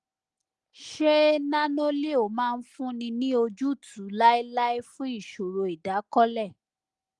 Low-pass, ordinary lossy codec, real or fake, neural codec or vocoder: 10.8 kHz; Opus, 24 kbps; real; none